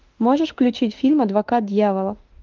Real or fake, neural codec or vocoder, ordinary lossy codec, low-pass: fake; autoencoder, 48 kHz, 32 numbers a frame, DAC-VAE, trained on Japanese speech; Opus, 32 kbps; 7.2 kHz